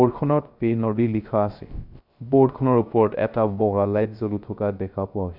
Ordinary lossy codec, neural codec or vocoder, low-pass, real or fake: none; codec, 16 kHz, 0.3 kbps, FocalCodec; 5.4 kHz; fake